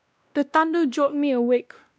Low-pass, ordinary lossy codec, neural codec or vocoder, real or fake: none; none; codec, 16 kHz, 1 kbps, X-Codec, WavLM features, trained on Multilingual LibriSpeech; fake